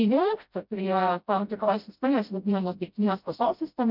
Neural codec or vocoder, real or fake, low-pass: codec, 16 kHz, 0.5 kbps, FreqCodec, smaller model; fake; 5.4 kHz